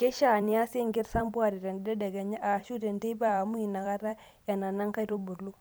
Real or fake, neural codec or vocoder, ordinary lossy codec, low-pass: fake; vocoder, 44.1 kHz, 128 mel bands every 256 samples, BigVGAN v2; none; none